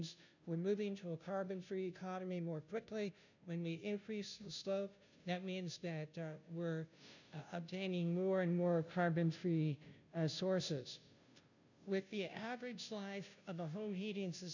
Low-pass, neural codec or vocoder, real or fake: 7.2 kHz; codec, 16 kHz, 0.5 kbps, FunCodec, trained on Chinese and English, 25 frames a second; fake